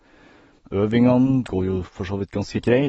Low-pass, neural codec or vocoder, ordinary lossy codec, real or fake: 19.8 kHz; none; AAC, 24 kbps; real